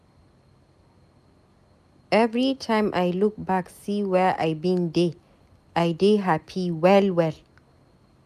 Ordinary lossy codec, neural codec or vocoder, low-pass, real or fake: none; none; 14.4 kHz; real